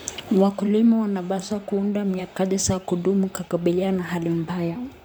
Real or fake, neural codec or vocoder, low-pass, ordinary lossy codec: fake; vocoder, 44.1 kHz, 128 mel bands, Pupu-Vocoder; none; none